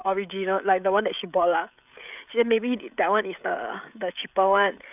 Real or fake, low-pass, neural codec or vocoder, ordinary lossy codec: fake; 3.6 kHz; codec, 16 kHz, 16 kbps, FreqCodec, smaller model; none